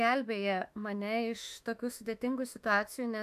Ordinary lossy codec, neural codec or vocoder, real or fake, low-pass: AAC, 96 kbps; autoencoder, 48 kHz, 32 numbers a frame, DAC-VAE, trained on Japanese speech; fake; 14.4 kHz